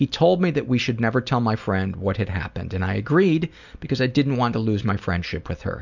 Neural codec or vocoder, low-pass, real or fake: none; 7.2 kHz; real